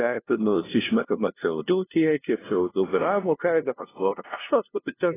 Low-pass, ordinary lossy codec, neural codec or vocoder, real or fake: 3.6 kHz; AAC, 16 kbps; codec, 16 kHz, 0.5 kbps, FunCodec, trained on LibriTTS, 25 frames a second; fake